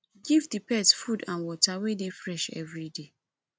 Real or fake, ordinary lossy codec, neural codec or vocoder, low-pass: real; none; none; none